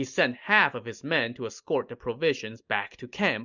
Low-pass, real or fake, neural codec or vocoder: 7.2 kHz; real; none